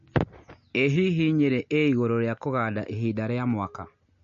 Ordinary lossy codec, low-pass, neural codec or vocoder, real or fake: MP3, 48 kbps; 7.2 kHz; none; real